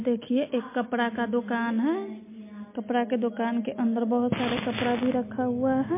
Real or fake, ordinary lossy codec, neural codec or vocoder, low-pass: real; MP3, 24 kbps; none; 3.6 kHz